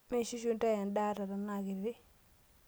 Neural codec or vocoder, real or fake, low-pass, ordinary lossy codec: none; real; none; none